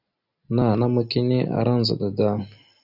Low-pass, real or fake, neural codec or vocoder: 5.4 kHz; real; none